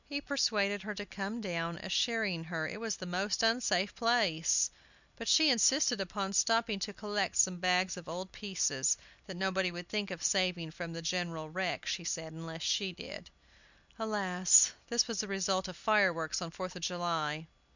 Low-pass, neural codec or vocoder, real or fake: 7.2 kHz; none; real